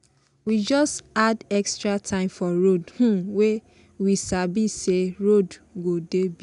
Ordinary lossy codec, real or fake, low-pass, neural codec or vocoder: none; real; 10.8 kHz; none